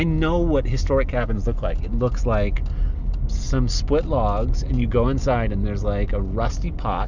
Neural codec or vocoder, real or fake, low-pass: none; real; 7.2 kHz